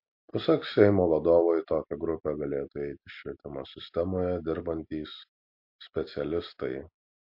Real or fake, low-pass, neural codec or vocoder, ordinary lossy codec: real; 5.4 kHz; none; MP3, 32 kbps